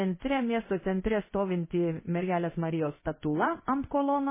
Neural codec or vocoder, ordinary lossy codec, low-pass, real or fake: codec, 16 kHz in and 24 kHz out, 1 kbps, XY-Tokenizer; MP3, 16 kbps; 3.6 kHz; fake